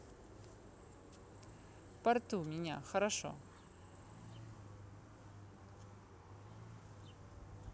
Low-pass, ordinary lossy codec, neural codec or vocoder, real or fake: none; none; none; real